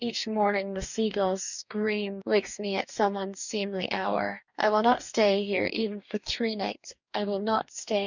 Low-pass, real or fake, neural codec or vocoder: 7.2 kHz; fake; codec, 44.1 kHz, 2.6 kbps, DAC